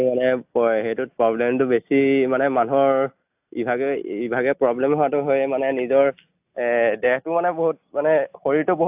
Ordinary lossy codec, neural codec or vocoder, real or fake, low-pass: AAC, 32 kbps; none; real; 3.6 kHz